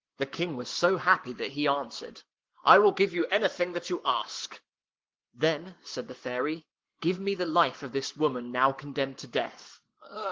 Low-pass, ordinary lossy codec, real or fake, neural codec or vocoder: 7.2 kHz; Opus, 16 kbps; fake; codec, 44.1 kHz, 7.8 kbps, Pupu-Codec